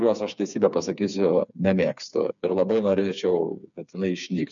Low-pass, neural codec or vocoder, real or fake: 7.2 kHz; codec, 16 kHz, 4 kbps, FreqCodec, smaller model; fake